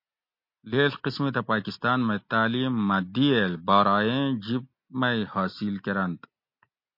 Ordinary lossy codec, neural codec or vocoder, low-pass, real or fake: MP3, 32 kbps; none; 5.4 kHz; real